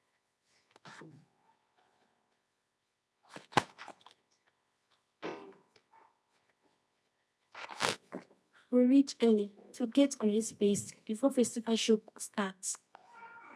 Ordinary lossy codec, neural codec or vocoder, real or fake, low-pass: none; codec, 24 kHz, 0.9 kbps, WavTokenizer, medium music audio release; fake; none